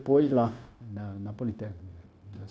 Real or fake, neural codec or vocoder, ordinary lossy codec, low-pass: fake; codec, 16 kHz, 0.9 kbps, LongCat-Audio-Codec; none; none